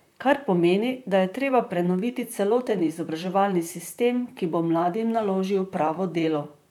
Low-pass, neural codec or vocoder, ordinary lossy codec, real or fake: 19.8 kHz; vocoder, 44.1 kHz, 128 mel bands, Pupu-Vocoder; none; fake